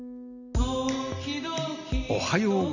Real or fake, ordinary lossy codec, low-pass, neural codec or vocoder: real; none; 7.2 kHz; none